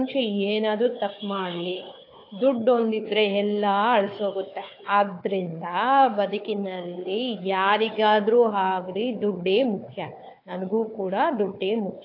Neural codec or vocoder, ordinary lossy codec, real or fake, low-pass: codec, 16 kHz, 4 kbps, FunCodec, trained on Chinese and English, 50 frames a second; AAC, 32 kbps; fake; 5.4 kHz